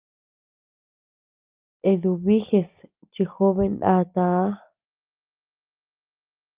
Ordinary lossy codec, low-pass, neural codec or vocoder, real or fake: Opus, 24 kbps; 3.6 kHz; none; real